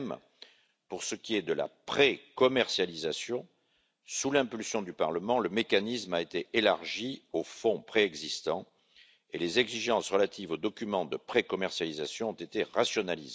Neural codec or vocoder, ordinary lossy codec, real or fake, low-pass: none; none; real; none